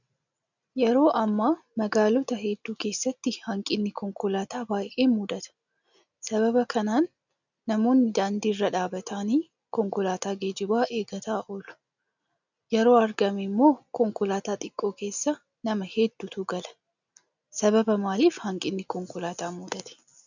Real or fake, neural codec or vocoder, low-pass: real; none; 7.2 kHz